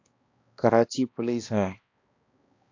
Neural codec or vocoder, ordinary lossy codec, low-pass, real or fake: codec, 16 kHz, 1 kbps, X-Codec, HuBERT features, trained on balanced general audio; AAC, 48 kbps; 7.2 kHz; fake